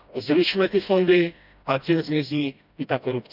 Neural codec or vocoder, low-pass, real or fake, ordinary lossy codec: codec, 16 kHz, 1 kbps, FreqCodec, smaller model; 5.4 kHz; fake; none